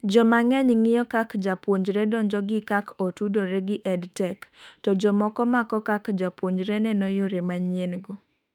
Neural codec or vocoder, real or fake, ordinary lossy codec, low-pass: autoencoder, 48 kHz, 32 numbers a frame, DAC-VAE, trained on Japanese speech; fake; none; 19.8 kHz